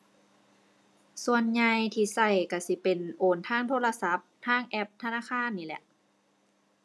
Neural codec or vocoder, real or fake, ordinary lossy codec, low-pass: none; real; none; none